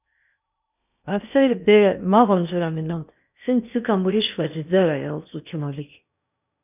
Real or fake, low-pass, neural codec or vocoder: fake; 3.6 kHz; codec, 16 kHz in and 24 kHz out, 0.8 kbps, FocalCodec, streaming, 65536 codes